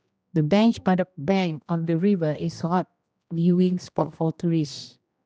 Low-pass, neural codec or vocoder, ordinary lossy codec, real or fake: none; codec, 16 kHz, 1 kbps, X-Codec, HuBERT features, trained on general audio; none; fake